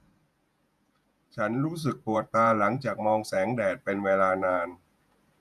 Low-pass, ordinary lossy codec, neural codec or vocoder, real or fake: 14.4 kHz; none; vocoder, 48 kHz, 128 mel bands, Vocos; fake